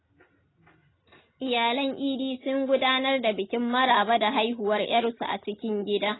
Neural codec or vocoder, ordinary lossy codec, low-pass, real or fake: none; AAC, 16 kbps; 7.2 kHz; real